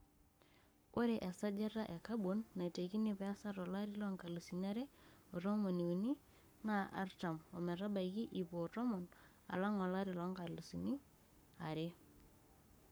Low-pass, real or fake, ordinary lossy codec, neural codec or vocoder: none; fake; none; codec, 44.1 kHz, 7.8 kbps, Pupu-Codec